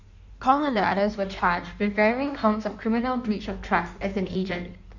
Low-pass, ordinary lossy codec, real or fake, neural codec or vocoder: 7.2 kHz; none; fake; codec, 16 kHz in and 24 kHz out, 1.1 kbps, FireRedTTS-2 codec